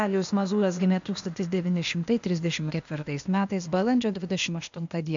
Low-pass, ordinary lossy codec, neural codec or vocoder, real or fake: 7.2 kHz; MP3, 64 kbps; codec, 16 kHz, 0.8 kbps, ZipCodec; fake